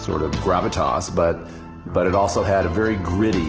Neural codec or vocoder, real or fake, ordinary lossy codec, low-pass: none; real; Opus, 16 kbps; 7.2 kHz